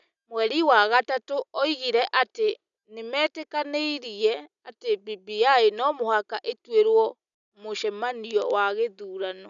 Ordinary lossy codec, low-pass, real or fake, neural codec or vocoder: none; 7.2 kHz; real; none